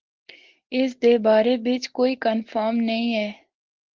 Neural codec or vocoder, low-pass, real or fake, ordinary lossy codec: none; 7.2 kHz; real; Opus, 16 kbps